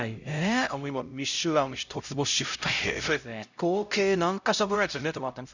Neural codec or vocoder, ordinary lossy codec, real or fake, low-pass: codec, 16 kHz, 0.5 kbps, X-Codec, HuBERT features, trained on LibriSpeech; none; fake; 7.2 kHz